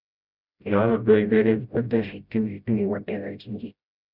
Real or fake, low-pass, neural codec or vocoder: fake; 5.4 kHz; codec, 16 kHz, 0.5 kbps, FreqCodec, smaller model